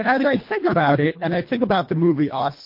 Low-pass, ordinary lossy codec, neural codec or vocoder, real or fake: 5.4 kHz; MP3, 32 kbps; codec, 24 kHz, 1.5 kbps, HILCodec; fake